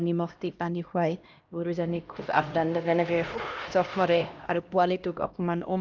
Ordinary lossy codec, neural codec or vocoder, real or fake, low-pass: Opus, 24 kbps; codec, 16 kHz, 1 kbps, X-Codec, HuBERT features, trained on LibriSpeech; fake; 7.2 kHz